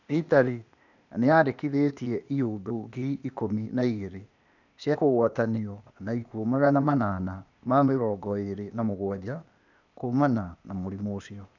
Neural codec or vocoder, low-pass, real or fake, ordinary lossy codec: codec, 16 kHz, 0.8 kbps, ZipCodec; 7.2 kHz; fake; none